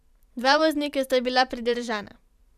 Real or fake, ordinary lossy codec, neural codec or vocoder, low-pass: fake; none; vocoder, 44.1 kHz, 128 mel bands every 512 samples, BigVGAN v2; 14.4 kHz